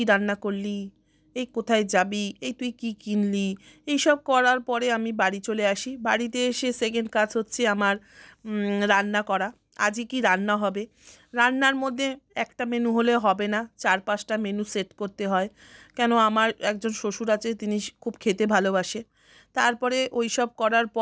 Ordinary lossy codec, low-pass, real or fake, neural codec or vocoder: none; none; real; none